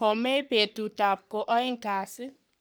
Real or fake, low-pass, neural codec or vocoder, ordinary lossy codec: fake; none; codec, 44.1 kHz, 3.4 kbps, Pupu-Codec; none